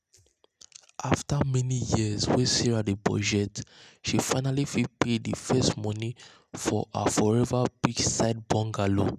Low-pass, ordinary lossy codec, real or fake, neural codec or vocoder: 14.4 kHz; none; real; none